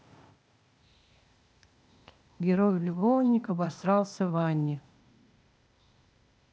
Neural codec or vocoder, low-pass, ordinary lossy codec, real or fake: codec, 16 kHz, 0.8 kbps, ZipCodec; none; none; fake